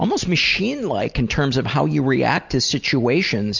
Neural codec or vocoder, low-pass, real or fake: none; 7.2 kHz; real